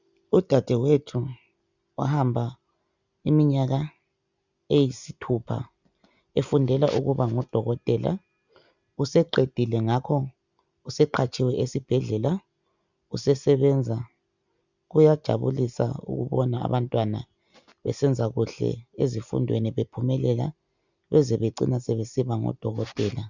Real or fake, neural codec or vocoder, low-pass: real; none; 7.2 kHz